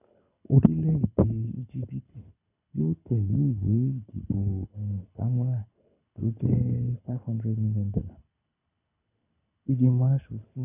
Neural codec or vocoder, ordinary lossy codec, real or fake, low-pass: codec, 24 kHz, 6 kbps, HILCodec; none; fake; 3.6 kHz